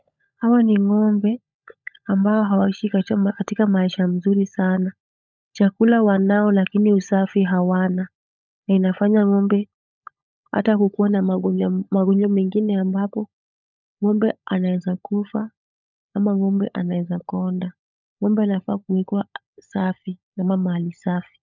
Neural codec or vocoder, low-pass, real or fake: codec, 16 kHz, 16 kbps, FunCodec, trained on LibriTTS, 50 frames a second; 7.2 kHz; fake